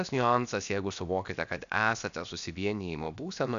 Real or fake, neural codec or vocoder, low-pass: fake; codec, 16 kHz, about 1 kbps, DyCAST, with the encoder's durations; 7.2 kHz